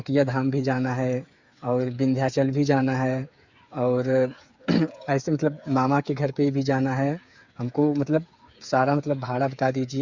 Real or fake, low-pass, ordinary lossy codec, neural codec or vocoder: fake; 7.2 kHz; Opus, 64 kbps; codec, 16 kHz, 8 kbps, FreqCodec, smaller model